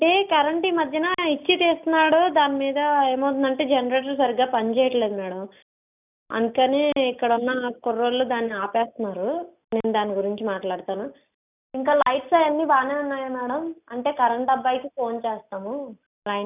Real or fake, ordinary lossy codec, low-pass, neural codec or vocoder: real; none; 3.6 kHz; none